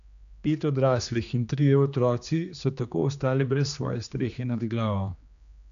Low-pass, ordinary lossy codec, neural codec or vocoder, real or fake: 7.2 kHz; none; codec, 16 kHz, 2 kbps, X-Codec, HuBERT features, trained on general audio; fake